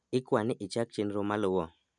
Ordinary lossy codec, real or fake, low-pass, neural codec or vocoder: none; real; 9.9 kHz; none